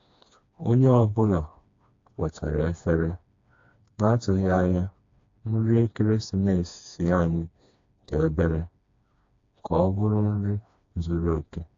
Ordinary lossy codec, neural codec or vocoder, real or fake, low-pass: AAC, 48 kbps; codec, 16 kHz, 2 kbps, FreqCodec, smaller model; fake; 7.2 kHz